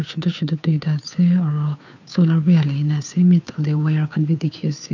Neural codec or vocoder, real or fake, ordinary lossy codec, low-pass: codec, 16 kHz, 2 kbps, FunCodec, trained on Chinese and English, 25 frames a second; fake; none; 7.2 kHz